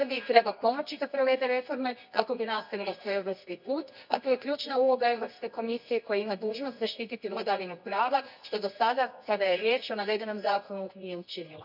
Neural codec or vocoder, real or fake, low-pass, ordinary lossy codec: codec, 24 kHz, 0.9 kbps, WavTokenizer, medium music audio release; fake; 5.4 kHz; none